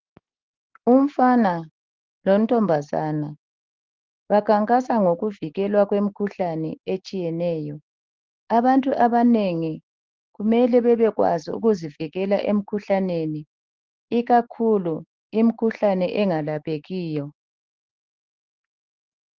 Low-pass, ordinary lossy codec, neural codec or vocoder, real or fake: 7.2 kHz; Opus, 16 kbps; none; real